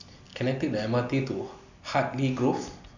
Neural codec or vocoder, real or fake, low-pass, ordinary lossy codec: none; real; 7.2 kHz; none